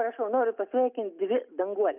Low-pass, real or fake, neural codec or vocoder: 3.6 kHz; real; none